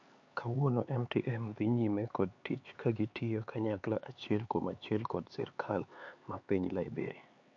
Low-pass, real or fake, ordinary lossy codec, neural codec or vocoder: 7.2 kHz; fake; MP3, 48 kbps; codec, 16 kHz, 4 kbps, X-Codec, HuBERT features, trained on LibriSpeech